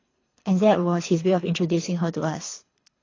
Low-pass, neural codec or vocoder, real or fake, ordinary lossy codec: 7.2 kHz; codec, 24 kHz, 3 kbps, HILCodec; fake; AAC, 32 kbps